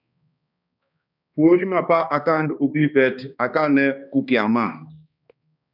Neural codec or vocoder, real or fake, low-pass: codec, 16 kHz, 2 kbps, X-Codec, HuBERT features, trained on balanced general audio; fake; 5.4 kHz